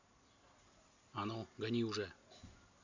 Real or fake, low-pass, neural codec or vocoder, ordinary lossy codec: real; 7.2 kHz; none; Opus, 64 kbps